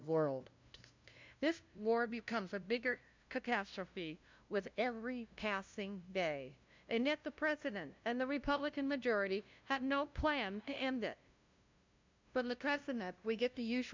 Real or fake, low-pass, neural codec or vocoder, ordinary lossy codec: fake; 7.2 kHz; codec, 16 kHz, 0.5 kbps, FunCodec, trained on LibriTTS, 25 frames a second; MP3, 64 kbps